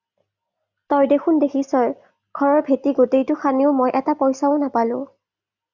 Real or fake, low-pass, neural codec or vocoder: real; 7.2 kHz; none